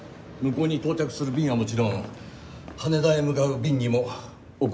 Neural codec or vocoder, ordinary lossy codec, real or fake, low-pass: none; none; real; none